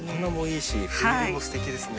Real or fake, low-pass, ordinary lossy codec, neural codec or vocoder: real; none; none; none